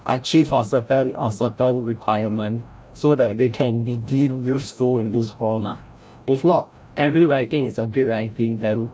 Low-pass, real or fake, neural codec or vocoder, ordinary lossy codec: none; fake; codec, 16 kHz, 0.5 kbps, FreqCodec, larger model; none